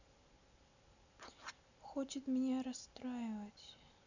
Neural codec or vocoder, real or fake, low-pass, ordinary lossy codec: none; real; 7.2 kHz; none